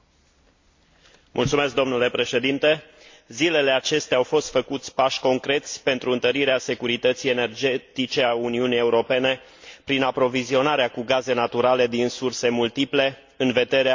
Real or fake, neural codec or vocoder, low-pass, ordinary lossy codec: real; none; 7.2 kHz; MP3, 48 kbps